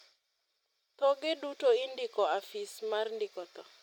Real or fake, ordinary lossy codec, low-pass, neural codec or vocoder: real; MP3, 96 kbps; 19.8 kHz; none